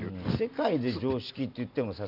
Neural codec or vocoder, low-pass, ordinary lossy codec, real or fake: none; 5.4 kHz; none; real